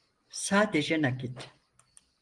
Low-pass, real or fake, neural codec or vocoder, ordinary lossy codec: 10.8 kHz; real; none; Opus, 24 kbps